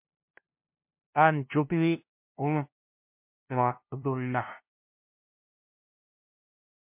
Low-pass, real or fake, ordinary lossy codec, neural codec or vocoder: 3.6 kHz; fake; MP3, 32 kbps; codec, 16 kHz, 0.5 kbps, FunCodec, trained on LibriTTS, 25 frames a second